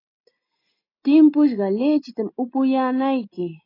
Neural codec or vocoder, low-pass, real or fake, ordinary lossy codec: none; 5.4 kHz; real; AAC, 24 kbps